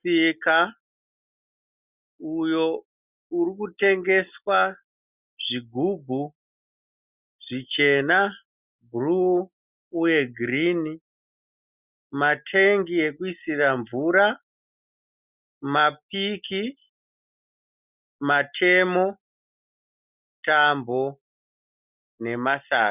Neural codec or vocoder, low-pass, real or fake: none; 3.6 kHz; real